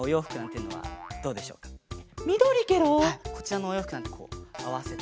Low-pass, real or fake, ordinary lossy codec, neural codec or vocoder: none; real; none; none